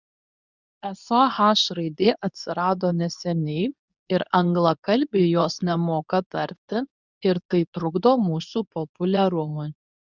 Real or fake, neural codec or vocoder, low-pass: fake; codec, 24 kHz, 0.9 kbps, WavTokenizer, medium speech release version 2; 7.2 kHz